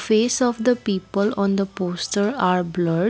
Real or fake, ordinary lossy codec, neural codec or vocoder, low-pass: real; none; none; none